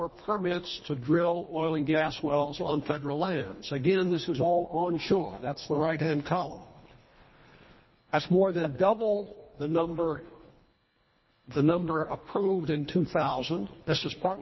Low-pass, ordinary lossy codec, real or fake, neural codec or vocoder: 7.2 kHz; MP3, 24 kbps; fake; codec, 24 kHz, 1.5 kbps, HILCodec